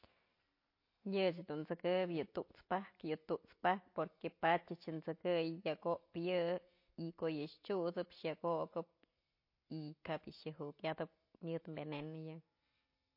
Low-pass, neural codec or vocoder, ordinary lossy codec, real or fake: 5.4 kHz; none; MP3, 32 kbps; real